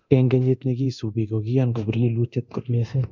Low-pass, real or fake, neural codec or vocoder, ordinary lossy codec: 7.2 kHz; fake; codec, 24 kHz, 0.9 kbps, DualCodec; none